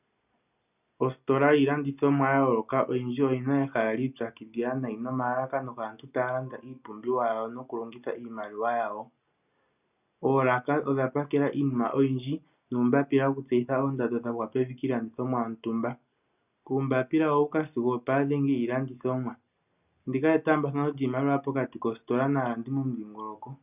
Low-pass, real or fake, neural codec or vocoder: 3.6 kHz; real; none